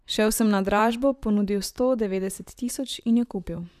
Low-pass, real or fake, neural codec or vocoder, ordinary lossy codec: 14.4 kHz; fake; vocoder, 44.1 kHz, 128 mel bands, Pupu-Vocoder; none